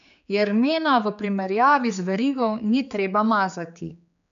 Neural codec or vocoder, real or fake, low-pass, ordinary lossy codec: codec, 16 kHz, 4 kbps, X-Codec, HuBERT features, trained on general audio; fake; 7.2 kHz; none